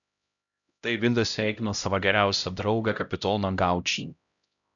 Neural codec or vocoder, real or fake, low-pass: codec, 16 kHz, 0.5 kbps, X-Codec, HuBERT features, trained on LibriSpeech; fake; 7.2 kHz